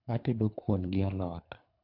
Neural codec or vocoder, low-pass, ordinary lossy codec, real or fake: codec, 24 kHz, 3 kbps, HILCodec; 5.4 kHz; MP3, 48 kbps; fake